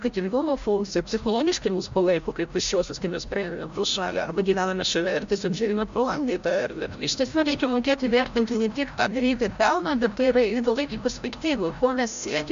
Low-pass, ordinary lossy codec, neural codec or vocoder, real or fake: 7.2 kHz; MP3, 64 kbps; codec, 16 kHz, 0.5 kbps, FreqCodec, larger model; fake